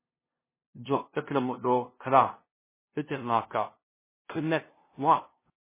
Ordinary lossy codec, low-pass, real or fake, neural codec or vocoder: MP3, 16 kbps; 3.6 kHz; fake; codec, 16 kHz, 0.5 kbps, FunCodec, trained on LibriTTS, 25 frames a second